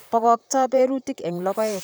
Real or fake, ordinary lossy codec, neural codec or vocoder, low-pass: fake; none; vocoder, 44.1 kHz, 128 mel bands, Pupu-Vocoder; none